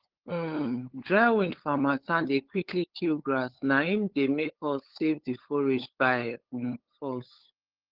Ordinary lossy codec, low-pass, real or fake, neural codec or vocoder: Opus, 16 kbps; 5.4 kHz; fake; codec, 16 kHz, 8 kbps, FunCodec, trained on LibriTTS, 25 frames a second